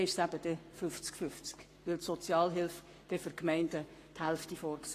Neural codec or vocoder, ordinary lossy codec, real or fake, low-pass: codec, 44.1 kHz, 7.8 kbps, Pupu-Codec; AAC, 48 kbps; fake; 14.4 kHz